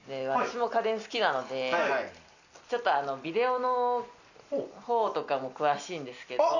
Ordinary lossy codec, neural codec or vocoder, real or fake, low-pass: none; none; real; 7.2 kHz